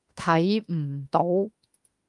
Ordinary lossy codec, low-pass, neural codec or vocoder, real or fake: Opus, 32 kbps; 10.8 kHz; autoencoder, 48 kHz, 32 numbers a frame, DAC-VAE, trained on Japanese speech; fake